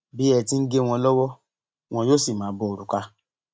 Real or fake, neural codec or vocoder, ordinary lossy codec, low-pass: real; none; none; none